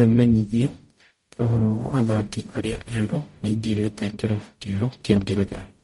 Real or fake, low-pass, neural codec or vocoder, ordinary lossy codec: fake; 19.8 kHz; codec, 44.1 kHz, 0.9 kbps, DAC; MP3, 48 kbps